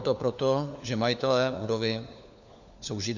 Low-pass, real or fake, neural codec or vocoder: 7.2 kHz; fake; codec, 16 kHz, 4 kbps, FunCodec, trained on LibriTTS, 50 frames a second